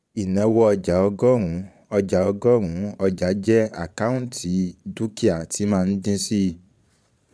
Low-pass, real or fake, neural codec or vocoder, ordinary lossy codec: none; fake; vocoder, 22.05 kHz, 80 mel bands, Vocos; none